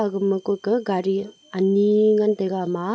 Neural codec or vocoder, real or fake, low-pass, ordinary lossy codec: none; real; none; none